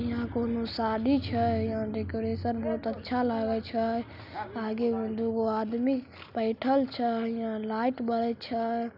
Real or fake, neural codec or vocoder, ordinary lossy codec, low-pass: real; none; none; 5.4 kHz